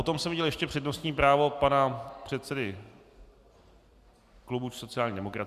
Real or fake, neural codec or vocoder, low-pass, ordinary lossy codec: real; none; 14.4 kHz; Opus, 64 kbps